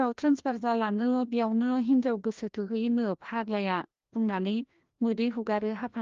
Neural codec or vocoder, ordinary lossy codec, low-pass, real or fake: codec, 16 kHz, 1 kbps, FreqCodec, larger model; Opus, 32 kbps; 7.2 kHz; fake